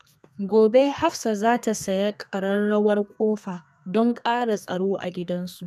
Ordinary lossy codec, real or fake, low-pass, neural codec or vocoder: none; fake; 14.4 kHz; codec, 32 kHz, 1.9 kbps, SNAC